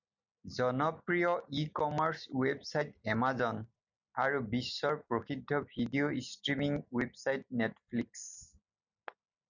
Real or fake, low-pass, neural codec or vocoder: real; 7.2 kHz; none